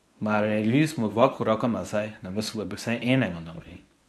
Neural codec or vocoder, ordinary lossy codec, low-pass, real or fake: codec, 24 kHz, 0.9 kbps, WavTokenizer, medium speech release version 1; none; none; fake